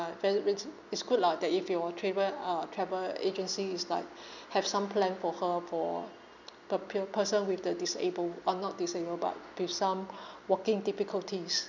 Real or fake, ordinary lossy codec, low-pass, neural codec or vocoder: real; none; 7.2 kHz; none